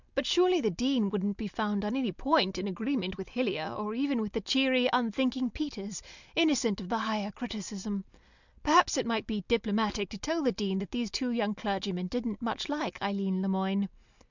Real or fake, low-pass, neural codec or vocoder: real; 7.2 kHz; none